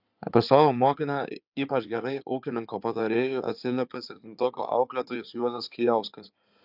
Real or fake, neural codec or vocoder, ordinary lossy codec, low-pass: fake; codec, 16 kHz in and 24 kHz out, 2.2 kbps, FireRedTTS-2 codec; AAC, 48 kbps; 5.4 kHz